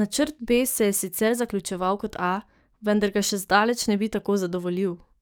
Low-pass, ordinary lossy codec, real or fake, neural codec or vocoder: none; none; fake; codec, 44.1 kHz, 7.8 kbps, DAC